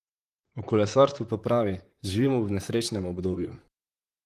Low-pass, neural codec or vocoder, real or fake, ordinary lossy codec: 14.4 kHz; vocoder, 44.1 kHz, 128 mel bands, Pupu-Vocoder; fake; Opus, 24 kbps